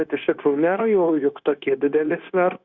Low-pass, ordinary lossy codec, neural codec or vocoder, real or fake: 7.2 kHz; Opus, 64 kbps; codec, 16 kHz, 0.9 kbps, LongCat-Audio-Codec; fake